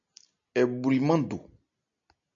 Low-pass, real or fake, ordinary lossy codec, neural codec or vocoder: 7.2 kHz; real; AAC, 64 kbps; none